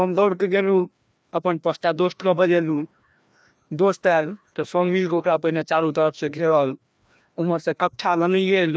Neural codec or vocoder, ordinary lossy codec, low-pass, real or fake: codec, 16 kHz, 1 kbps, FreqCodec, larger model; none; none; fake